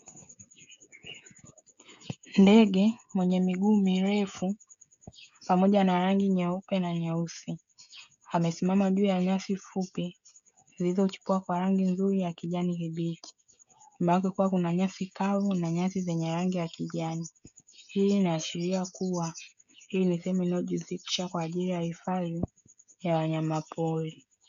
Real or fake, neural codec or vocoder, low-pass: fake; codec, 16 kHz, 16 kbps, FreqCodec, smaller model; 7.2 kHz